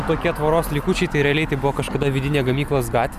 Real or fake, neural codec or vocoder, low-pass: real; none; 14.4 kHz